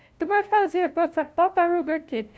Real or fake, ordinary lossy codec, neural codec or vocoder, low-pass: fake; none; codec, 16 kHz, 0.5 kbps, FunCodec, trained on LibriTTS, 25 frames a second; none